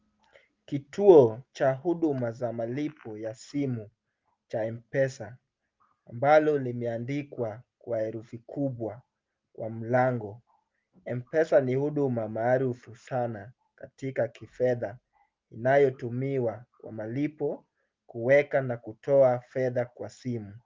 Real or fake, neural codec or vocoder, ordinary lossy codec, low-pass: real; none; Opus, 24 kbps; 7.2 kHz